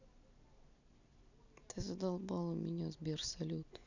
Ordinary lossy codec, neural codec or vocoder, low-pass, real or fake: none; none; 7.2 kHz; real